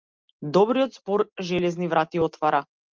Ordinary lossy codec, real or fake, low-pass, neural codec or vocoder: Opus, 24 kbps; real; 7.2 kHz; none